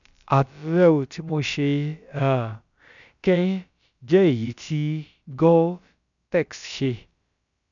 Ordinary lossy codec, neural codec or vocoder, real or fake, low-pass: none; codec, 16 kHz, about 1 kbps, DyCAST, with the encoder's durations; fake; 7.2 kHz